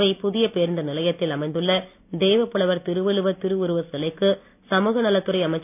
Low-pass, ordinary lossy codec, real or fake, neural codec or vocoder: 3.6 kHz; none; real; none